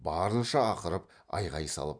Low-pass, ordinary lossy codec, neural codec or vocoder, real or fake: 9.9 kHz; none; none; real